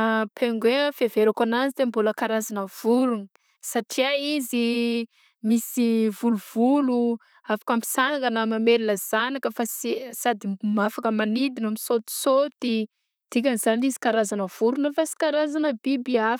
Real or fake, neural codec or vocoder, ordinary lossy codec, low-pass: fake; vocoder, 44.1 kHz, 128 mel bands, Pupu-Vocoder; none; none